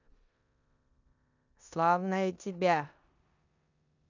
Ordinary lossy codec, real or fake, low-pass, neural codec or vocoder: none; fake; 7.2 kHz; codec, 16 kHz in and 24 kHz out, 0.9 kbps, LongCat-Audio-Codec, four codebook decoder